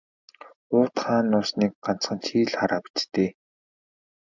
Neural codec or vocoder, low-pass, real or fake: none; 7.2 kHz; real